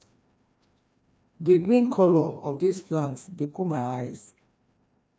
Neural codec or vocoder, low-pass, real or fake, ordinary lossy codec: codec, 16 kHz, 1 kbps, FreqCodec, larger model; none; fake; none